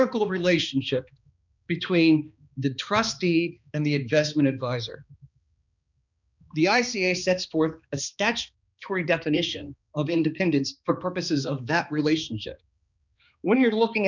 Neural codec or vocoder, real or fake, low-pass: codec, 16 kHz, 2 kbps, X-Codec, HuBERT features, trained on balanced general audio; fake; 7.2 kHz